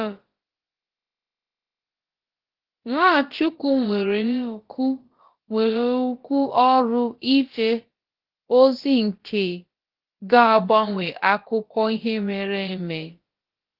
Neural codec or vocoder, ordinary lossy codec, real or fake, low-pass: codec, 16 kHz, about 1 kbps, DyCAST, with the encoder's durations; Opus, 16 kbps; fake; 5.4 kHz